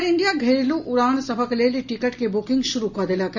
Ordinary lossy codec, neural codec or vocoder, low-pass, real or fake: none; none; 7.2 kHz; real